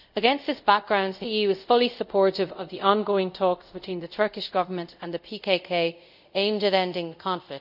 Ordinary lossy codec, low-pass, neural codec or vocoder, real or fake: none; 5.4 kHz; codec, 24 kHz, 0.5 kbps, DualCodec; fake